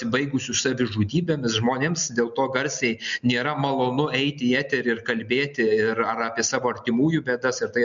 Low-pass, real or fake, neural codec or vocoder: 7.2 kHz; real; none